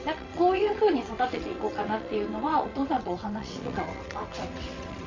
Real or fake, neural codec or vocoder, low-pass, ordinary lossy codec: fake; vocoder, 22.05 kHz, 80 mel bands, WaveNeXt; 7.2 kHz; MP3, 64 kbps